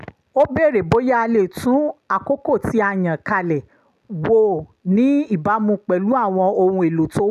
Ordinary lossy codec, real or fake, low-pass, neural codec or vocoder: none; real; 14.4 kHz; none